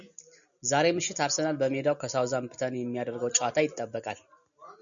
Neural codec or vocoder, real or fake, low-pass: none; real; 7.2 kHz